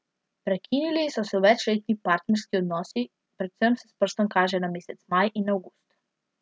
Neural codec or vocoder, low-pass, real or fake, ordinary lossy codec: none; none; real; none